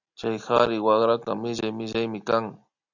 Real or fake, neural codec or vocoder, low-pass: real; none; 7.2 kHz